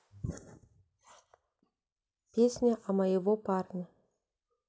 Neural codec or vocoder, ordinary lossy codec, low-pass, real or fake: none; none; none; real